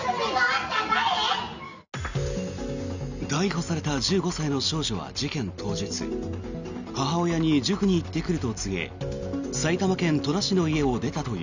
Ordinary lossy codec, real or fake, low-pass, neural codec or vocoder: none; real; 7.2 kHz; none